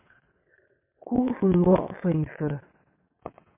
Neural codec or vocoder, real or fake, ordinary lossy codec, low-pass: vocoder, 22.05 kHz, 80 mel bands, WaveNeXt; fake; MP3, 24 kbps; 3.6 kHz